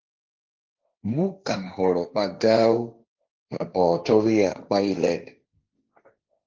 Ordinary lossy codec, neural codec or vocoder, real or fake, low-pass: Opus, 32 kbps; codec, 16 kHz, 1.1 kbps, Voila-Tokenizer; fake; 7.2 kHz